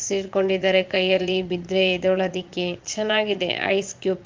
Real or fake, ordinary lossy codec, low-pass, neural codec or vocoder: fake; Opus, 24 kbps; 7.2 kHz; vocoder, 22.05 kHz, 80 mel bands, Vocos